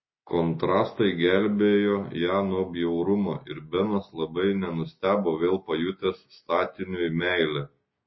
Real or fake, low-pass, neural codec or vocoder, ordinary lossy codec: real; 7.2 kHz; none; MP3, 24 kbps